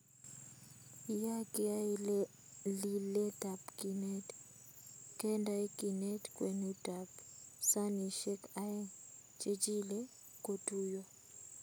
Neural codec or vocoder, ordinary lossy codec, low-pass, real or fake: none; none; none; real